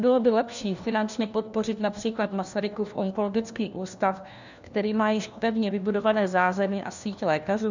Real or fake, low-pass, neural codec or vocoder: fake; 7.2 kHz; codec, 16 kHz, 1 kbps, FunCodec, trained on LibriTTS, 50 frames a second